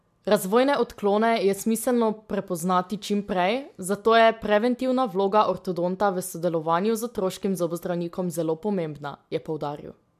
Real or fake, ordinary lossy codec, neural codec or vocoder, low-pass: real; MP3, 96 kbps; none; 14.4 kHz